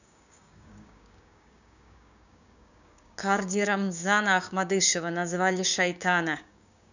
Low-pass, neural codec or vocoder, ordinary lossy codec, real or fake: 7.2 kHz; codec, 16 kHz, 6 kbps, DAC; none; fake